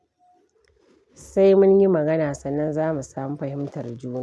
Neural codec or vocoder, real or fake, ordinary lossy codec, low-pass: none; real; none; none